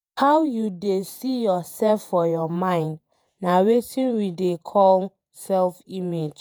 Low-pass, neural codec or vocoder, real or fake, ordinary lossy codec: none; vocoder, 48 kHz, 128 mel bands, Vocos; fake; none